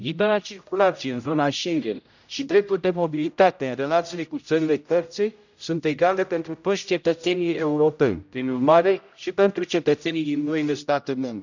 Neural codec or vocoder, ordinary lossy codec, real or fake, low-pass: codec, 16 kHz, 0.5 kbps, X-Codec, HuBERT features, trained on general audio; none; fake; 7.2 kHz